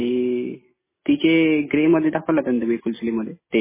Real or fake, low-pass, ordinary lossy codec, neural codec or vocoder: real; 3.6 kHz; MP3, 16 kbps; none